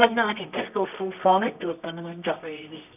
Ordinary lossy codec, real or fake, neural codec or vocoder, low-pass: none; fake; codec, 24 kHz, 0.9 kbps, WavTokenizer, medium music audio release; 3.6 kHz